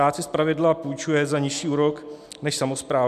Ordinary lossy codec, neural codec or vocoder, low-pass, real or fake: MP3, 96 kbps; none; 14.4 kHz; real